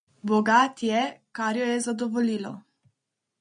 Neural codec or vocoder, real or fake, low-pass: none; real; 9.9 kHz